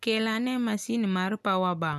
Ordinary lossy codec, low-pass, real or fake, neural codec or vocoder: none; 14.4 kHz; real; none